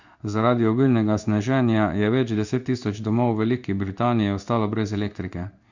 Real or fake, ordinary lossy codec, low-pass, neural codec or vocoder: fake; Opus, 64 kbps; 7.2 kHz; codec, 16 kHz in and 24 kHz out, 1 kbps, XY-Tokenizer